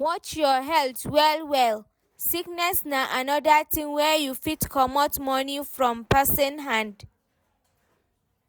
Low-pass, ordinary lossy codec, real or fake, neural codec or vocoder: none; none; real; none